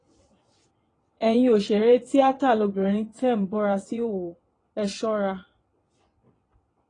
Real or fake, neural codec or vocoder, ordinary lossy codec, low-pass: fake; vocoder, 22.05 kHz, 80 mel bands, WaveNeXt; AAC, 32 kbps; 9.9 kHz